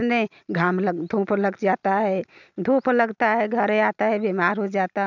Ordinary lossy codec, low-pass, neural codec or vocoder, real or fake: none; 7.2 kHz; none; real